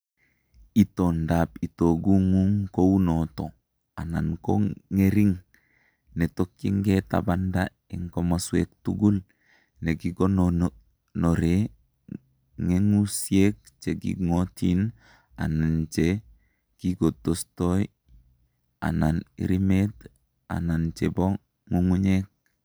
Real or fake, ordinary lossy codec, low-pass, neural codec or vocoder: real; none; none; none